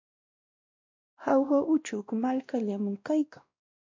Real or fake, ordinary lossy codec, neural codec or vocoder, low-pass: fake; MP3, 48 kbps; codec, 16 kHz, 1 kbps, X-Codec, WavLM features, trained on Multilingual LibriSpeech; 7.2 kHz